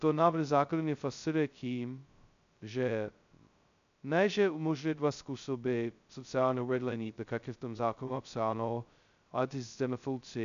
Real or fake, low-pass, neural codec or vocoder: fake; 7.2 kHz; codec, 16 kHz, 0.2 kbps, FocalCodec